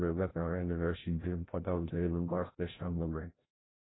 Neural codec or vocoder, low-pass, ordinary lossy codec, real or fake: codec, 16 kHz, 0.5 kbps, FreqCodec, larger model; 7.2 kHz; AAC, 16 kbps; fake